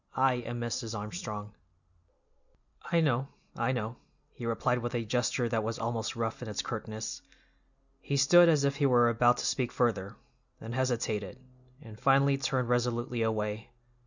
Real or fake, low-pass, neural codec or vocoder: real; 7.2 kHz; none